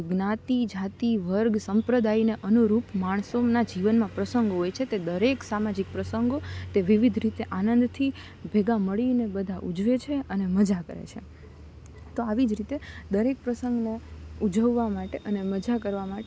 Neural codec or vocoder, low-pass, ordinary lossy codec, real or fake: none; none; none; real